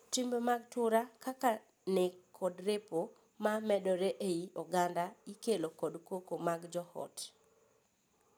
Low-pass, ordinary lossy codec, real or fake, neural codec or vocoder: none; none; real; none